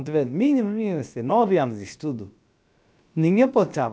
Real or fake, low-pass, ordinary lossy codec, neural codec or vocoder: fake; none; none; codec, 16 kHz, about 1 kbps, DyCAST, with the encoder's durations